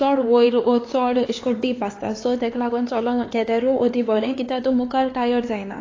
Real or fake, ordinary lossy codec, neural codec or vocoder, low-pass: fake; AAC, 32 kbps; codec, 16 kHz, 4 kbps, X-Codec, HuBERT features, trained on LibriSpeech; 7.2 kHz